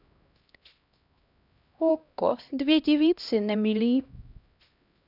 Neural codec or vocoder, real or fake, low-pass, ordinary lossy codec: codec, 16 kHz, 1 kbps, X-Codec, HuBERT features, trained on LibriSpeech; fake; 5.4 kHz; none